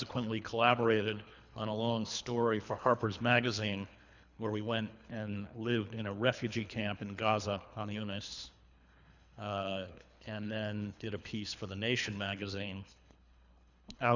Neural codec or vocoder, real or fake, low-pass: codec, 24 kHz, 3 kbps, HILCodec; fake; 7.2 kHz